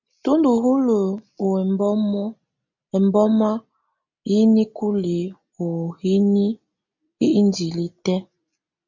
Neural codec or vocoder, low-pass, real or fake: none; 7.2 kHz; real